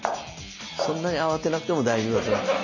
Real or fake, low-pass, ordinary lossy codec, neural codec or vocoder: real; 7.2 kHz; none; none